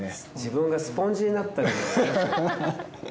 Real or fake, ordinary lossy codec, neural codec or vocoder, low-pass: real; none; none; none